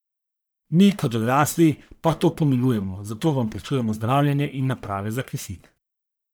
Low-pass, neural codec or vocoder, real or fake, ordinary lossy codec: none; codec, 44.1 kHz, 1.7 kbps, Pupu-Codec; fake; none